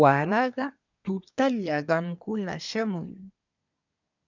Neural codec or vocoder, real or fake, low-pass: codec, 24 kHz, 1 kbps, SNAC; fake; 7.2 kHz